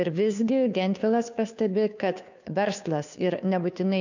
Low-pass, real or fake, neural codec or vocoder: 7.2 kHz; fake; codec, 16 kHz, 2 kbps, FunCodec, trained on Chinese and English, 25 frames a second